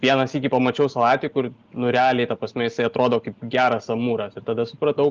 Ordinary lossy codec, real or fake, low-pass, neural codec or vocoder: Opus, 32 kbps; real; 7.2 kHz; none